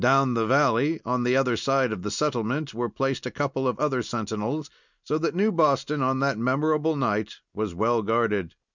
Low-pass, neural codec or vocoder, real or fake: 7.2 kHz; none; real